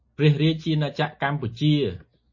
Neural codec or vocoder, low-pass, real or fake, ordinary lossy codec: none; 7.2 kHz; real; MP3, 32 kbps